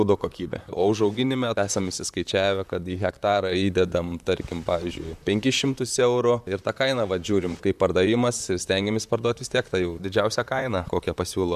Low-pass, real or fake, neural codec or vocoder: 14.4 kHz; fake; vocoder, 44.1 kHz, 128 mel bands, Pupu-Vocoder